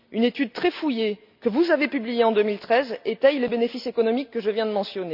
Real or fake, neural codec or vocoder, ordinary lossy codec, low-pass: real; none; none; 5.4 kHz